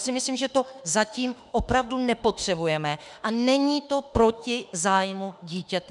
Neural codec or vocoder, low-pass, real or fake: autoencoder, 48 kHz, 32 numbers a frame, DAC-VAE, trained on Japanese speech; 10.8 kHz; fake